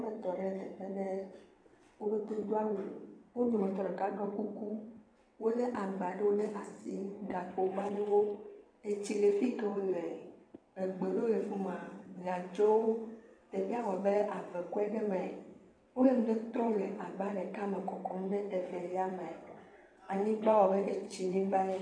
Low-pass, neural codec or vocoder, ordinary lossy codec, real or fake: 9.9 kHz; vocoder, 22.05 kHz, 80 mel bands, WaveNeXt; MP3, 96 kbps; fake